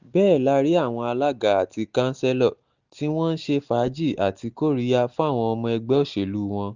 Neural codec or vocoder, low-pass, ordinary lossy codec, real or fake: none; 7.2 kHz; Opus, 64 kbps; real